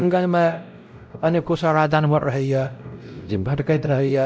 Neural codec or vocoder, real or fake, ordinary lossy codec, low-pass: codec, 16 kHz, 0.5 kbps, X-Codec, WavLM features, trained on Multilingual LibriSpeech; fake; none; none